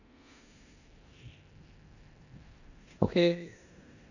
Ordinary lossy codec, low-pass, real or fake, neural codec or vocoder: none; 7.2 kHz; fake; codec, 16 kHz in and 24 kHz out, 0.9 kbps, LongCat-Audio-Codec, four codebook decoder